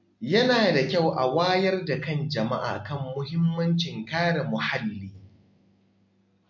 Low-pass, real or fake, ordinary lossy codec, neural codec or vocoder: 7.2 kHz; real; MP3, 48 kbps; none